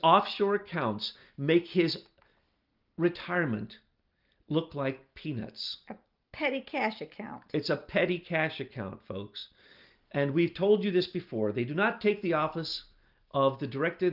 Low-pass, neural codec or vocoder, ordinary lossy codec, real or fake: 5.4 kHz; none; Opus, 24 kbps; real